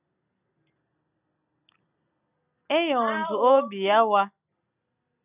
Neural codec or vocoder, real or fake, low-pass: none; real; 3.6 kHz